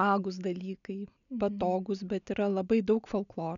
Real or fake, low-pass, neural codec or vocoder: real; 7.2 kHz; none